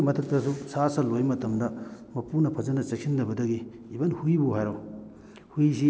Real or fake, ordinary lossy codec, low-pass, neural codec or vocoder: real; none; none; none